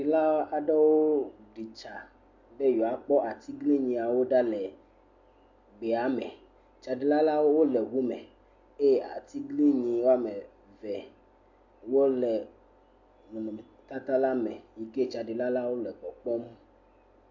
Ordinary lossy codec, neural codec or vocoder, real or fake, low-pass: MP3, 48 kbps; none; real; 7.2 kHz